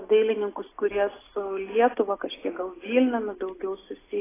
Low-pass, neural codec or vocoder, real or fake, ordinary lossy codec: 3.6 kHz; none; real; AAC, 16 kbps